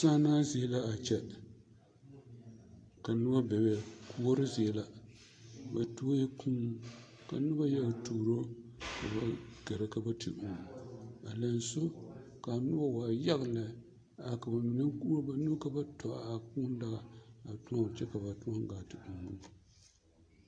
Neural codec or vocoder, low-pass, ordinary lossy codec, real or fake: none; 9.9 kHz; AAC, 48 kbps; real